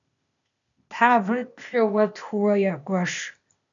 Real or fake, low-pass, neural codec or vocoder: fake; 7.2 kHz; codec, 16 kHz, 0.8 kbps, ZipCodec